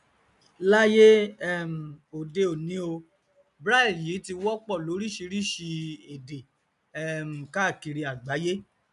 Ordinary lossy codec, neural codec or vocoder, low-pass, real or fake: none; none; 10.8 kHz; real